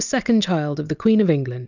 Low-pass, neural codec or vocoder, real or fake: 7.2 kHz; none; real